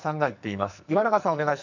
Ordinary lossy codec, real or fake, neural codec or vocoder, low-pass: none; fake; codec, 44.1 kHz, 2.6 kbps, SNAC; 7.2 kHz